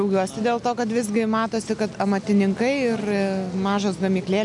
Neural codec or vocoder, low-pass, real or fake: none; 10.8 kHz; real